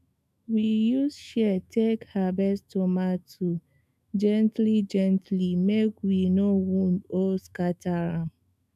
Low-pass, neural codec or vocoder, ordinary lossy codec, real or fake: 14.4 kHz; autoencoder, 48 kHz, 128 numbers a frame, DAC-VAE, trained on Japanese speech; none; fake